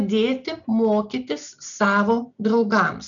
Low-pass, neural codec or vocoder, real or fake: 7.2 kHz; none; real